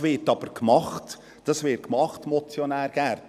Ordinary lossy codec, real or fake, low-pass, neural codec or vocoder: none; real; 14.4 kHz; none